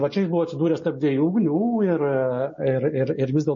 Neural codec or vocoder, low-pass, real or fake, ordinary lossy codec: none; 7.2 kHz; real; MP3, 32 kbps